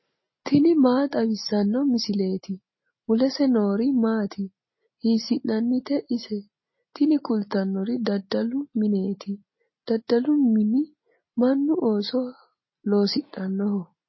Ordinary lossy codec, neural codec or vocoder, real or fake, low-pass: MP3, 24 kbps; none; real; 7.2 kHz